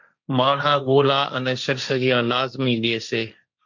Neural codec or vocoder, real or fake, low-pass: codec, 16 kHz, 1.1 kbps, Voila-Tokenizer; fake; 7.2 kHz